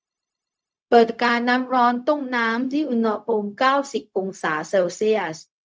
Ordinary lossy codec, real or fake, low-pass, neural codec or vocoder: none; fake; none; codec, 16 kHz, 0.4 kbps, LongCat-Audio-Codec